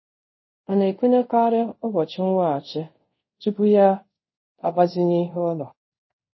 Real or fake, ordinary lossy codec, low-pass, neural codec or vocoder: fake; MP3, 24 kbps; 7.2 kHz; codec, 24 kHz, 0.5 kbps, DualCodec